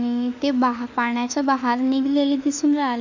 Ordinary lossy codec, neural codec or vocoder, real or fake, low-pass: none; autoencoder, 48 kHz, 32 numbers a frame, DAC-VAE, trained on Japanese speech; fake; 7.2 kHz